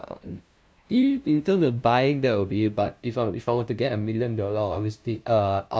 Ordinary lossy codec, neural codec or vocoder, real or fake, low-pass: none; codec, 16 kHz, 0.5 kbps, FunCodec, trained on LibriTTS, 25 frames a second; fake; none